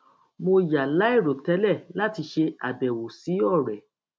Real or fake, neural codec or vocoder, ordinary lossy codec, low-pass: real; none; none; none